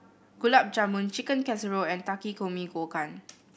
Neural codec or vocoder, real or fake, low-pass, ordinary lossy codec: none; real; none; none